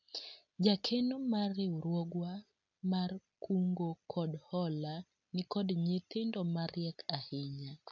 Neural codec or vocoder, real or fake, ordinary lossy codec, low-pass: none; real; none; 7.2 kHz